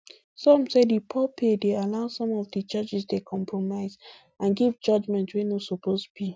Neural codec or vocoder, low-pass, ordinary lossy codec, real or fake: none; none; none; real